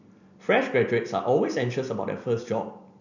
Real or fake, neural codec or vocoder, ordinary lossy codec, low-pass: real; none; none; 7.2 kHz